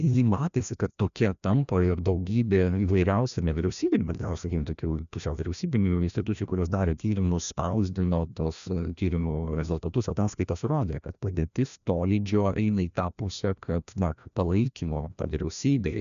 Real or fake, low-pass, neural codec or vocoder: fake; 7.2 kHz; codec, 16 kHz, 1 kbps, FreqCodec, larger model